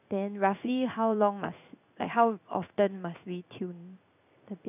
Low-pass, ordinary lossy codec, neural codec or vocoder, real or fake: 3.6 kHz; none; codec, 16 kHz in and 24 kHz out, 1 kbps, XY-Tokenizer; fake